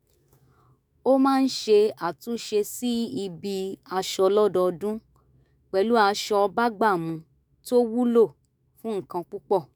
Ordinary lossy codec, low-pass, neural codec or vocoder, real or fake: none; none; autoencoder, 48 kHz, 128 numbers a frame, DAC-VAE, trained on Japanese speech; fake